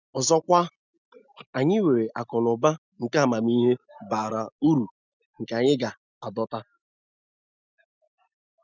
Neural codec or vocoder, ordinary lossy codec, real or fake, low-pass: none; none; real; 7.2 kHz